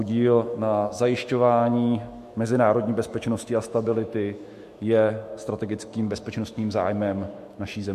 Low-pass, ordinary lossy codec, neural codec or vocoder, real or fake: 14.4 kHz; MP3, 64 kbps; autoencoder, 48 kHz, 128 numbers a frame, DAC-VAE, trained on Japanese speech; fake